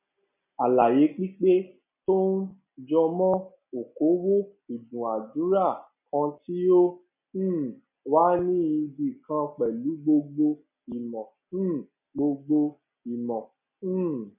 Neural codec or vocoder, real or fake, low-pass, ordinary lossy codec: none; real; 3.6 kHz; none